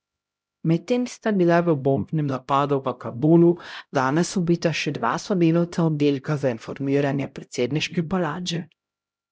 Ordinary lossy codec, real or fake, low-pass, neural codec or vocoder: none; fake; none; codec, 16 kHz, 0.5 kbps, X-Codec, HuBERT features, trained on LibriSpeech